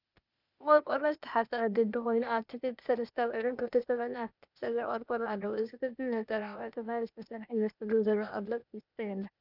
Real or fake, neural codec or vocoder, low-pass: fake; codec, 16 kHz, 0.8 kbps, ZipCodec; 5.4 kHz